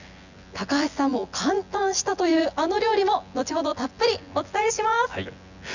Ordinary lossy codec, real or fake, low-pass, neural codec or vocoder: none; fake; 7.2 kHz; vocoder, 24 kHz, 100 mel bands, Vocos